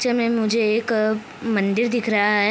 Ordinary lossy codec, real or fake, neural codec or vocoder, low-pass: none; real; none; none